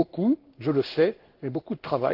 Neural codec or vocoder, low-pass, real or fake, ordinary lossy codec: codec, 16 kHz in and 24 kHz out, 1 kbps, XY-Tokenizer; 5.4 kHz; fake; Opus, 16 kbps